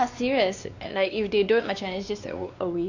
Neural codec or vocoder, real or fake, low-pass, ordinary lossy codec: codec, 16 kHz, 2 kbps, X-Codec, WavLM features, trained on Multilingual LibriSpeech; fake; 7.2 kHz; none